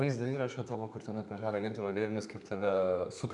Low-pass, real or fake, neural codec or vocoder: 10.8 kHz; fake; codec, 32 kHz, 1.9 kbps, SNAC